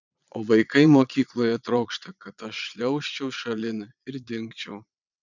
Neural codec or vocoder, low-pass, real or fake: vocoder, 22.05 kHz, 80 mel bands, Vocos; 7.2 kHz; fake